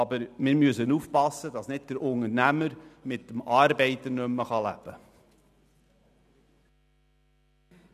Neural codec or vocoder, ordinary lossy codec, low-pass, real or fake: none; none; 14.4 kHz; real